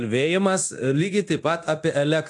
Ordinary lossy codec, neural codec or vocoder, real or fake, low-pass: AAC, 64 kbps; codec, 24 kHz, 0.9 kbps, DualCodec; fake; 10.8 kHz